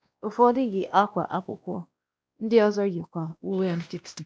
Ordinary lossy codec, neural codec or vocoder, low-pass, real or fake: none; codec, 16 kHz, 1 kbps, X-Codec, WavLM features, trained on Multilingual LibriSpeech; none; fake